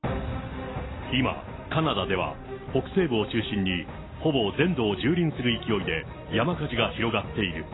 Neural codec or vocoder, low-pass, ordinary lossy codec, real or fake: none; 7.2 kHz; AAC, 16 kbps; real